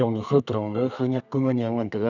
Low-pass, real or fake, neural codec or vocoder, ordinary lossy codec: 7.2 kHz; fake; codec, 32 kHz, 1.9 kbps, SNAC; none